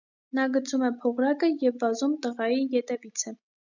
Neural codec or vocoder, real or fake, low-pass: none; real; 7.2 kHz